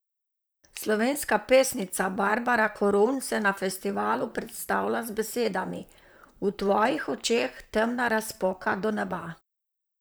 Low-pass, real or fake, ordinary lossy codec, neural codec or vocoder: none; fake; none; vocoder, 44.1 kHz, 128 mel bands, Pupu-Vocoder